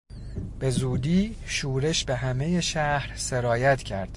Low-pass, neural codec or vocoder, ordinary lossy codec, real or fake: 10.8 kHz; none; MP3, 48 kbps; real